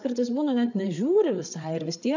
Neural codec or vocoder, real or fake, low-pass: codec, 16 kHz, 8 kbps, FreqCodec, larger model; fake; 7.2 kHz